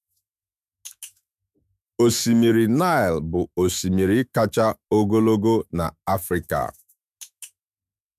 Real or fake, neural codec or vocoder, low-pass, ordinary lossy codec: fake; autoencoder, 48 kHz, 128 numbers a frame, DAC-VAE, trained on Japanese speech; 14.4 kHz; MP3, 96 kbps